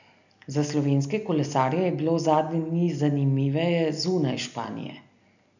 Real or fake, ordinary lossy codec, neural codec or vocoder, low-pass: real; none; none; 7.2 kHz